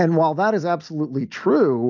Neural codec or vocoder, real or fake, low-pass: none; real; 7.2 kHz